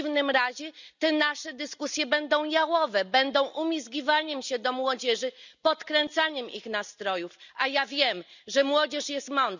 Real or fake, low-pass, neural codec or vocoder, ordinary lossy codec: real; 7.2 kHz; none; none